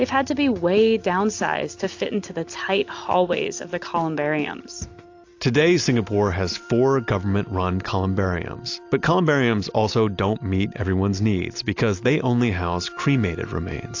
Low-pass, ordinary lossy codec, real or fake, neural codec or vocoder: 7.2 kHz; AAC, 48 kbps; real; none